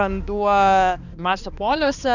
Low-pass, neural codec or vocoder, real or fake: 7.2 kHz; codec, 16 kHz, 2 kbps, X-Codec, HuBERT features, trained on balanced general audio; fake